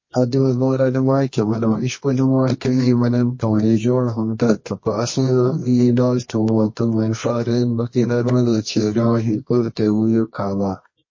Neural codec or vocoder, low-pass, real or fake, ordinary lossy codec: codec, 24 kHz, 0.9 kbps, WavTokenizer, medium music audio release; 7.2 kHz; fake; MP3, 32 kbps